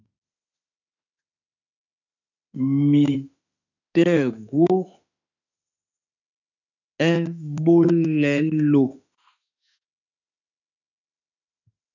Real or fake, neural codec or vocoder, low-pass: fake; autoencoder, 48 kHz, 32 numbers a frame, DAC-VAE, trained on Japanese speech; 7.2 kHz